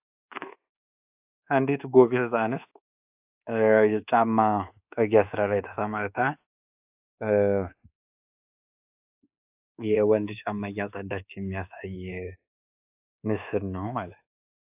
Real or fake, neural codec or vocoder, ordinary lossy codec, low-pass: fake; codec, 24 kHz, 1.2 kbps, DualCodec; AAC, 32 kbps; 3.6 kHz